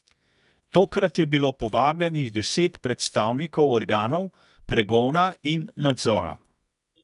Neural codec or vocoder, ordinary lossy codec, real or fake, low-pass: codec, 24 kHz, 0.9 kbps, WavTokenizer, medium music audio release; none; fake; 10.8 kHz